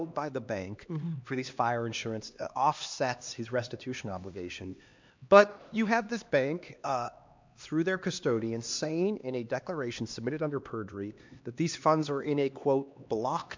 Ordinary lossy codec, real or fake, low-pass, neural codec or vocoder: MP3, 64 kbps; fake; 7.2 kHz; codec, 16 kHz, 2 kbps, X-Codec, HuBERT features, trained on LibriSpeech